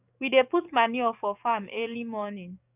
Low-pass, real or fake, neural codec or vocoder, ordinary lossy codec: 3.6 kHz; fake; codec, 44.1 kHz, 7.8 kbps, DAC; none